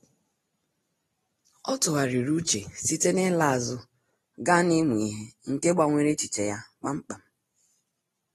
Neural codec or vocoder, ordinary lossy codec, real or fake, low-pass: none; AAC, 32 kbps; real; 19.8 kHz